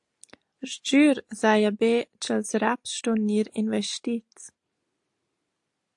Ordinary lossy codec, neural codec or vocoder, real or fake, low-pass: AAC, 64 kbps; none; real; 10.8 kHz